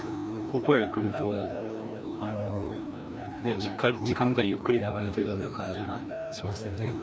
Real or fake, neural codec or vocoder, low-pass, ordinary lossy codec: fake; codec, 16 kHz, 1 kbps, FreqCodec, larger model; none; none